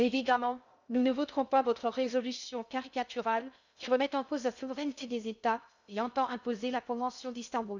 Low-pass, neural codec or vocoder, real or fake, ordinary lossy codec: 7.2 kHz; codec, 16 kHz in and 24 kHz out, 0.6 kbps, FocalCodec, streaming, 2048 codes; fake; none